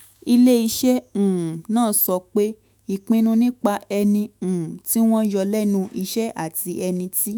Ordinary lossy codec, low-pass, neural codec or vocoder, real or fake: none; none; autoencoder, 48 kHz, 128 numbers a frame, DAC-VAE, trained on Japanese speech; fake